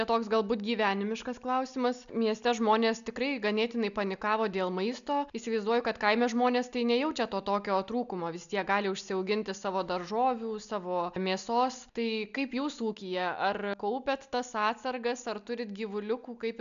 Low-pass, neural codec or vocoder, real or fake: 7.2 kHz; none; real